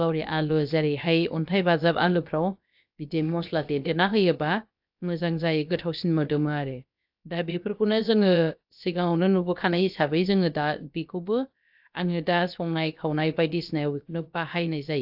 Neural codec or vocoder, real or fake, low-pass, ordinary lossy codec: codec, 16 kHz, about 1 kbps, DyCAST, with the encoder's durations; fake; 5.4 kHz; AAC, 48 kbps